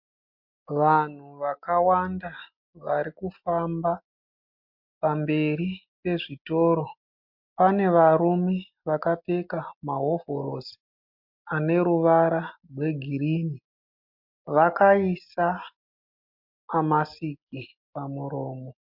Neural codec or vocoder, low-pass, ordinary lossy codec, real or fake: none; 5.4 kHz; MP3, 48 kbps; real